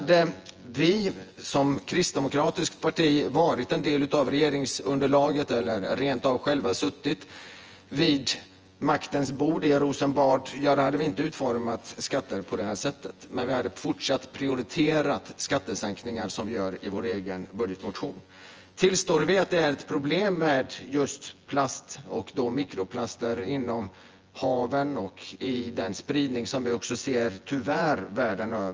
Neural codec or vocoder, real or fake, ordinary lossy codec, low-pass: vocoder, 24 kHz, 100 mel bands, Vocos; fake; Opus, 24 kbps; 7.2 kHz